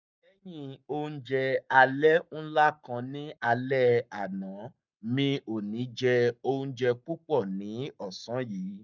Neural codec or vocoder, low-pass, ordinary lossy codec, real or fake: codec, 44.1 kHz, 7.8 kbps, Pupu-Codec; 7.2 kHz; none; fake